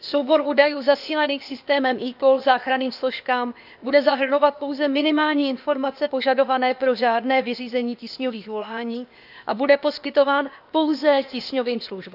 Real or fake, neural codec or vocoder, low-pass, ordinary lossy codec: fake; codec, 16 kHz, 0.8 kbps, ZipCodec; 5.4 kHz; none